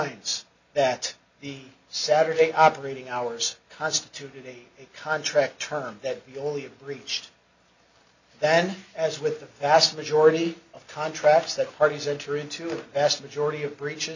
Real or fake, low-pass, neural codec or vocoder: real; 7.2 kHz; none